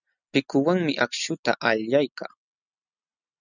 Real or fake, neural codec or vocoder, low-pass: real; none; 7.2 kHz